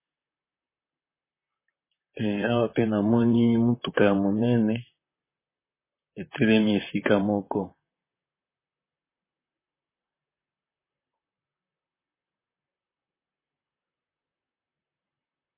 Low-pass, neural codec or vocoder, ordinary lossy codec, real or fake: 3.6 kHz; vocoder, 44.1 kHz, 128 mel bands, Pupu-Vocoder; MP3, 16 kbps; fake